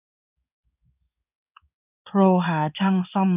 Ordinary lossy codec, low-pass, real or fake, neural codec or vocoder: none; 3.6 kHz; fake; codec, 16 kHz in and 24 kHz out, 1 kbps, XY-Tokenizer